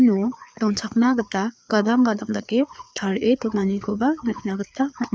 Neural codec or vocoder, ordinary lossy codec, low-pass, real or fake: codec, 16 kHz, 4 kbps, FreqCodec, larger model; none; none; fake